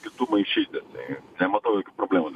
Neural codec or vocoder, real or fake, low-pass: none; real; 14.4 kHz